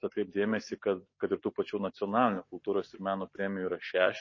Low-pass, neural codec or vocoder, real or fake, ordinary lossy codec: 7.2 kHz; none; real; MP3, 32 kbps